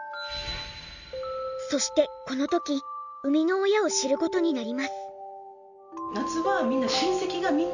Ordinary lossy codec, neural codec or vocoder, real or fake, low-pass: none; none; real; 7.2 kHz